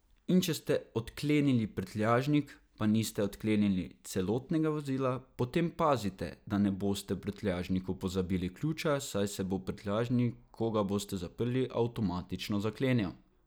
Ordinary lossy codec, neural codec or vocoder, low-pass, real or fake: none; none; none; real